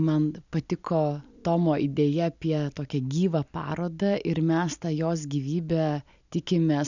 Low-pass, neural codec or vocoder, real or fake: 7.2 kHz; none; real